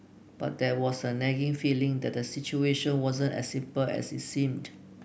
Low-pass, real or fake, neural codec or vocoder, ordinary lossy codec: none; real; none; none